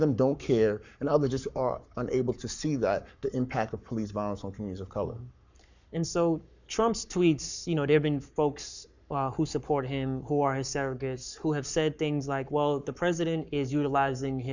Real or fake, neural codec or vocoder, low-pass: fake; codec, 44.1 kHz, 7.8 kbps, Pupu-Codec; 7.2 kHz